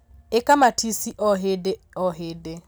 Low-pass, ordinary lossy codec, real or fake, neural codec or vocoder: none; none; real; none